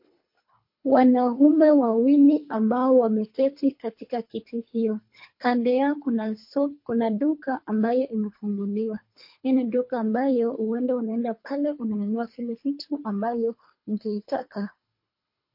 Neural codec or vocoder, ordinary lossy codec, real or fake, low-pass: codec, 24 kHz, 3 kbps, HILCodec; MP3, 32 kbps; fake; 5.4 kHz